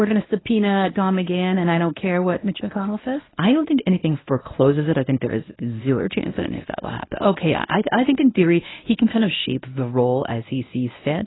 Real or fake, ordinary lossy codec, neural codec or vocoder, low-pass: fake; AAC, 16 kbps; codec, 24 kHz, 0.9 kbps, WavTokenizer, medium speech release version 1; 7.2 kHz